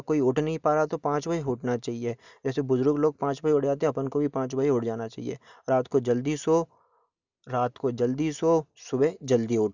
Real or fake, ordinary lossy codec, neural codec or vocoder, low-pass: real; none; none; 7.2 kHz